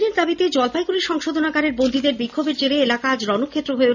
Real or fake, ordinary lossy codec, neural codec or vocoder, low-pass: real; none; none; 7.2 kHz